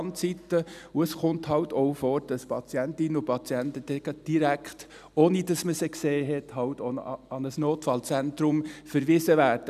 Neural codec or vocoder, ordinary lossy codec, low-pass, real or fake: none; none; 14.4 kHz; real